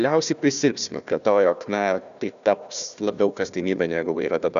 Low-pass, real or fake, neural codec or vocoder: 7.2 kHz; fake; codec, 16 kHz, 1 kbps, FunCodec, trained on Chinese and English, 50 frames a second